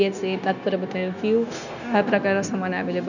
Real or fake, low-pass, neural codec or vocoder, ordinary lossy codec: fake; 7.2 kHz; codec, 16 kHz, 0.9 kbps, LongCat-Audio-Codec; none